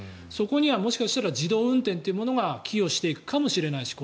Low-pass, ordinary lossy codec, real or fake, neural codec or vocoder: none; none; real; none